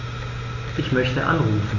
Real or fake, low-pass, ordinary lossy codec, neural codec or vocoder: real; 7.2 kHz; none; none